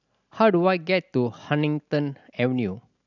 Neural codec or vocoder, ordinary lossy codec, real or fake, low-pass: none; none; real; 7.2 kHz